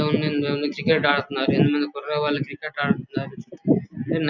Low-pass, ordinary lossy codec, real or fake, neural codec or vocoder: 7.2 kHz; none; real; none